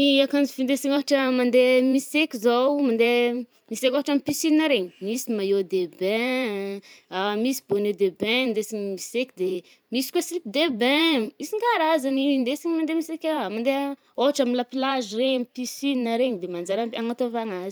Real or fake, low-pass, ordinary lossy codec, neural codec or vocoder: fake; none; none; vocoder, 44.1 kHz, 128 mel bands every 512 samples, BigVGAN v2